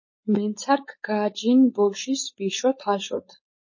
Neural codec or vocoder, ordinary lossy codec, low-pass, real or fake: codec, 16 kHz, 4.8 kbps, FACodec; MP3, 32 kbps; 7.2 kHz; fake